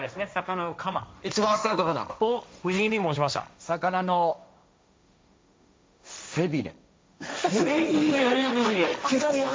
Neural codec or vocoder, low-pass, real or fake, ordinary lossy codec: codec, 16 kHz, 1.1 kbps, Voila-Tokenizer; none; fake; none